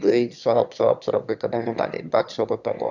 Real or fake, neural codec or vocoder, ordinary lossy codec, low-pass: fake; autoencoder, 22.05 kHz, a latent of 192 numbers a frame, VITS, trained on one speaker; none; 7.2 kHz